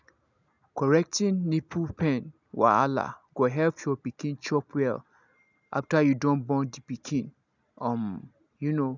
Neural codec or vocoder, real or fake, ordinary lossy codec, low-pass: none; real; none; 7.2 kHz